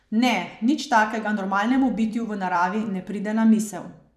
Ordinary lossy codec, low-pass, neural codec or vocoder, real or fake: none; 14.4 kHz; vocoder, 44.1 kHz, 128 mel bands every 256 samples, BigVGAN v2; fake